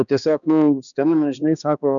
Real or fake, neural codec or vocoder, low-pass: fake; codec, 16 kHz, 2 kbps, X-Codec, HuBERT features, trained on balanced general audio; 7.2 kHz